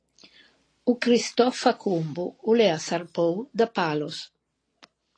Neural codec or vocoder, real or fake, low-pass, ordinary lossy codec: none; real; 9.9 kHz; AAC, 32 kbps